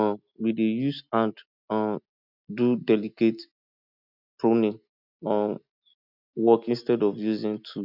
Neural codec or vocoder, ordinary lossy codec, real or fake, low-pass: none; none; real; 5.4 kHz